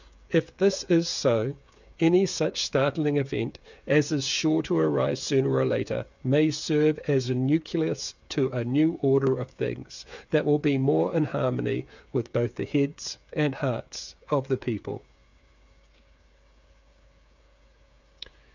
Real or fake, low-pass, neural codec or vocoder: fake; 7.2 kHz; codec, 16 kHz, 8 kbps, FreqCodec, smaller model